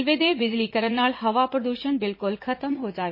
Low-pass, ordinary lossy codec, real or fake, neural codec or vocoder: 5.4 kHz; MP3, 24 kbps; fake; vocoder, 44.1 kHz, 80 mel bands, Vocos